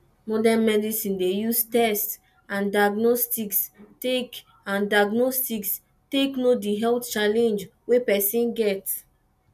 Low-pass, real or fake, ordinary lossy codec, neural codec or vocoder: 14.4 kHz; real; none; none